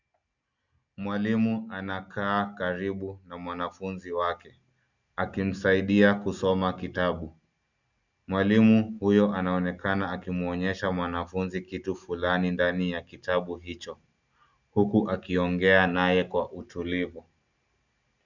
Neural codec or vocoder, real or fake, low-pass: none; real; 7.2 kHz